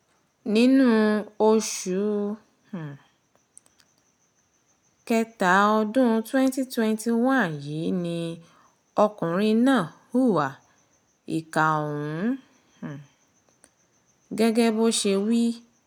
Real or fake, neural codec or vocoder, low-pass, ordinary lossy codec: real; none; 19.8 kHz; none